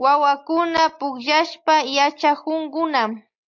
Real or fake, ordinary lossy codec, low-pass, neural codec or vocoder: real; MP3, 48 kbps; 7.2 kHz; none